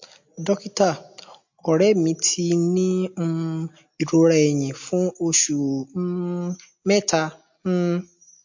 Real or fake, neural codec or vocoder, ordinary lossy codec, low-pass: real; none; MP3, 48 kbps; 7.2 kHz